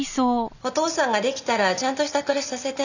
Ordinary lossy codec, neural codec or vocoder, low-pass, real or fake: none; none; 7.2 kHz; real